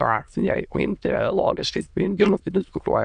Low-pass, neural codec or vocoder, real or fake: 9.9 kHz; autoencoder, 22.05 kHz, a latent of 192 numbers a frame, VITS, trained on many speakers; fake